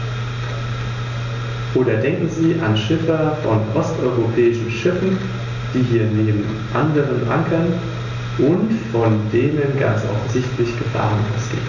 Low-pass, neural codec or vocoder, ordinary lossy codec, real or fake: 7.2 kHz; none; none; real